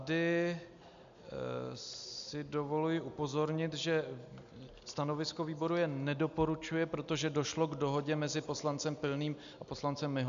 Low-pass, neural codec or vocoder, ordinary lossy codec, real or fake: 7.2 kHz; none; MP3, 64 kbps; real